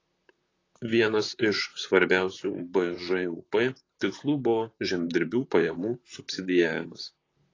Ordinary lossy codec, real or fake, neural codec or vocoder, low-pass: AAC, 32 kbps; fake; vocoder, 44.1 kHz, 128 mel bands, Pupu-Vocoder; 7.2 kHz